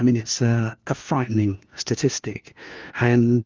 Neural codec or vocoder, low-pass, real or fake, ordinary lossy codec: codec, 16 kHz, 1 kbps, FunCodec, trained on LibriTTS, 50 frames a second; 7.2 kHz; fake; Opus, 32 kbps